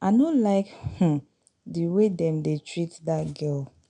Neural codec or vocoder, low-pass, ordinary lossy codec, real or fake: none; 9.9 kHz; none; real